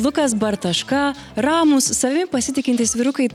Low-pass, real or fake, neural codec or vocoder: 19.8 kHz; real; none